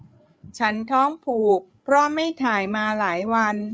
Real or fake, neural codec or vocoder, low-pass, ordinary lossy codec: fake; codec, 16 kHz, 8 kbps, FreqCodec, larger model; none; none